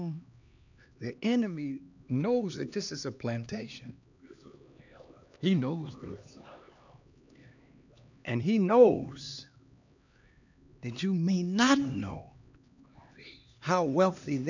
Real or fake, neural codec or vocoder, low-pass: fake; codec, 16 kHz, 2 kbps, X-Codec, HuBERT features, trained on LibriSpeech; 7.2 kHz